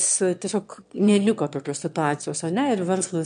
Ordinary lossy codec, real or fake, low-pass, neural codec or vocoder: MP3, 64 kbps; fake; 9.9 kHz; autoencoder, 22.05 kHz, a latent of 192 numbers a frame, VITS, trained on one speaker